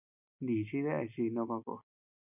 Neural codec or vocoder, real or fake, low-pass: none; real; 3.6 kHz